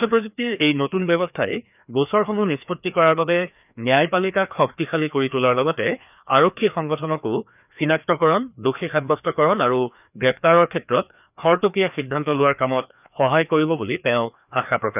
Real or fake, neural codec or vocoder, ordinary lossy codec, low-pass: fake; codec, 16 kHz, 2 kbps, FreqCodec, larger model; none; 3.6 kHz